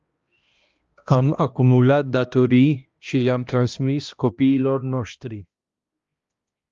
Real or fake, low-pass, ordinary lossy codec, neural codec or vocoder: fake; 7.2 kHz; Opus, 32 kbps; codec, 16 kHz, 1 kbps, X-Codec, HuBERT features, trained on balanced general audio